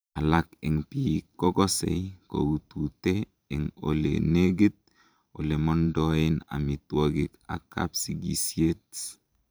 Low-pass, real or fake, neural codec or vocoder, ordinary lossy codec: none; fake; vocoder, 44.1 kHz, 128 mel bands every 512 samples, BigVGAN v2; none